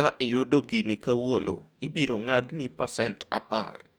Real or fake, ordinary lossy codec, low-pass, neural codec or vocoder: fake; none; none; codec, 44.1 kHz, 2.6 kbps, DAC